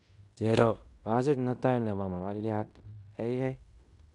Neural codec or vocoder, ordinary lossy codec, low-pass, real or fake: codec, 16 kHz in and 24 kHz out, 0.9 kbps, LongCat-Audio-Codec, fine tuned four codebook decoder; none; 10.8 kHz; fake